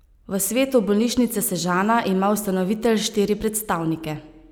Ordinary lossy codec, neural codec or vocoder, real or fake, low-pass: none; none; real; none